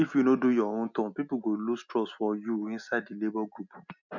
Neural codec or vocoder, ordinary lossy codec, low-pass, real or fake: none; none; 7.2 kHz; real